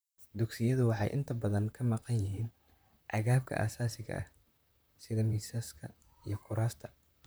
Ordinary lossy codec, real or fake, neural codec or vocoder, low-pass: none; fake; vocoder, 44.1 kHz, 128 mel bands, Pupu-Vocoder; none